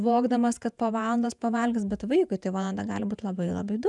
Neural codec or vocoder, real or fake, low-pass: vocoder, 48 kHz, 128 mel bands, Vocos; fake; 10.8 kHz